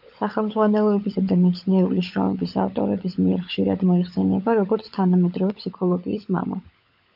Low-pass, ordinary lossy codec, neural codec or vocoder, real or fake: 5.4 kHz; AAC, 48 kbps; codec, 16 kHz, 16 kbps, FunCodec, trained on LibriTTS, 50 frames a second; fake